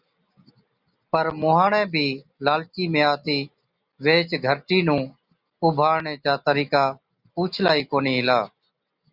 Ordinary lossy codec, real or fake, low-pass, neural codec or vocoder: Opus, 64 kbps; real; 5.4 kHz; none